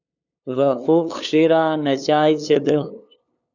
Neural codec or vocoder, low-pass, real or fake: codec, 16 kHz, 2 kbps, FunCodec, trained on LibriTTS, 25 frames a second; 7.2 kHz; fake